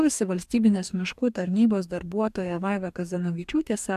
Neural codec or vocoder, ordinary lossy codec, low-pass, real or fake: codec, 44.1 kHz, 2.6 kbps, DAC; AAC, 96 kbps; 14.4 kHz; fake